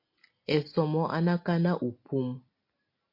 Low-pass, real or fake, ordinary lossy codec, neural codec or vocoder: 5.4 kHz; real; MP3, 32 kbps; none